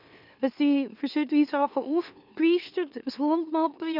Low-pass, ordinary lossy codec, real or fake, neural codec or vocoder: 5.4 kHz; none; fake; autoencoder, 44.1 kHz, a latent of 192 numbers a frame, MeloTTS